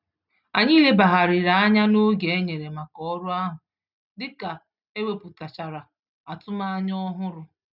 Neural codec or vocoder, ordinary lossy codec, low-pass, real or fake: none; none; 5.4 kHz; real